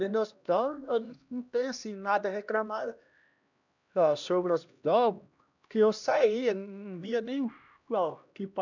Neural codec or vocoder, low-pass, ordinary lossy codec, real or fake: codec, 16 kHz, 1 kbps, X-Codec, HuBERT features, trained on LibriSpeech; 7.2 kHz; none; fake